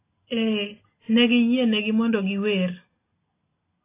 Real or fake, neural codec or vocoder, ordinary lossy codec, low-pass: real; none; AAC, 24 kbps; 3.6 kHz